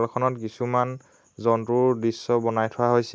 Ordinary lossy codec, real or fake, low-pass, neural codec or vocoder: none; real; none; none